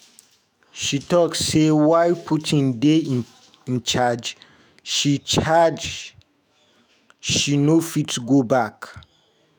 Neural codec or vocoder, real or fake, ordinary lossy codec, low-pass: autoencoder, 48 kHz, 128 numbers a frame, DAC-VAE, trained on Japanese speech; fake; none; none